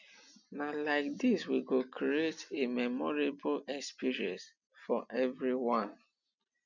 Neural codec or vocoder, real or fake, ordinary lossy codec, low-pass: none; real; none; 7.2 kHz